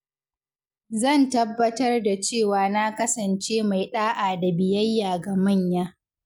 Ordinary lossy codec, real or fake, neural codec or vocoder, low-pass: none; real; none; 19.8 kHz